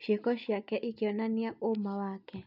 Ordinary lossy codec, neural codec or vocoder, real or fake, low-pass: none; none; real; 5.4 kHz